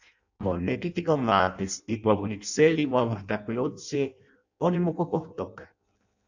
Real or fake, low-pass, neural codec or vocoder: fake; 7.2 kHz; codec, 16 kHz in and 24 kHz out, 0.6 kbps, FireRedTTS-2 codec